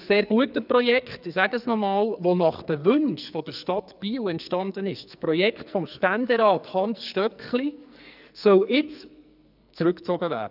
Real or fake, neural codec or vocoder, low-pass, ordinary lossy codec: fake; codec, 44.1 kHz, 2.6 kbps, SNAC; 5.4 kHz; none